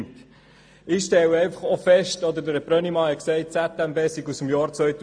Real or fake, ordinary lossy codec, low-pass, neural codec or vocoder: real; AAC, 64 kbps; 9.9 kHz; none